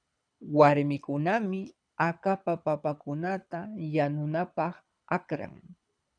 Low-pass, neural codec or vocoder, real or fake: 9.9 kHz; codec, 24 kHz, 6 kbps, HILCodec; fake